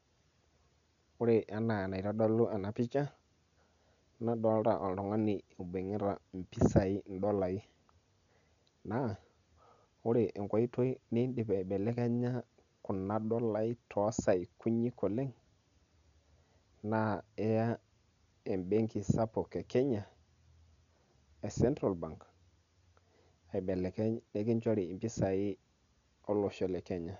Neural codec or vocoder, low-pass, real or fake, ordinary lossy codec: none; 7.2 kHz; real; none